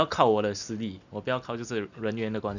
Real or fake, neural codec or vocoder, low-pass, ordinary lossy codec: real; none; 7.2 kHz; none